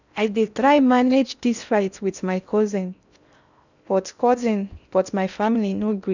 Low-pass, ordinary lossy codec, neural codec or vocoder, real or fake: 7.2 kHz; none; codec, 16 kHz in and 24 kHz out, 0.6 kbps, FocalCodec, streaming, 4096 codes; fake